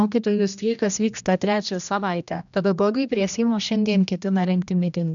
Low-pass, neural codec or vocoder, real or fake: 7.2 kHz; codec, 16 kHz, 1 kbps, X-Codec, HuBERT features, trained on general audio; fake